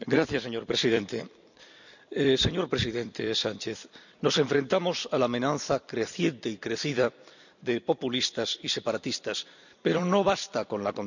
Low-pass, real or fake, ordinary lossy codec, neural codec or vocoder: 7.2 kHz; fake; none; vocoder, 44.1 kHz, 128 mel bands every 256 samples, BigVGAN v2